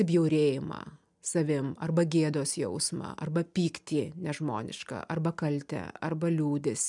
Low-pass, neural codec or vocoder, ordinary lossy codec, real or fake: 10.8 kHz; none; MP3, 96 kbps; real